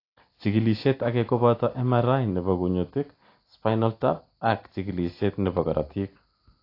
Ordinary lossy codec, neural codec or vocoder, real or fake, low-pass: AAC, 32 kbps; none; real; 5.4 kHz